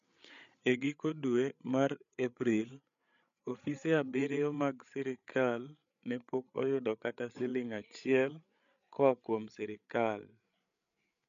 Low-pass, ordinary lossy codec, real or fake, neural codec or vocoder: 7.2 kHz; MP3, 64 kbps; fake; codec, 16 kHz, 8 kbps, FreqCodec, larger model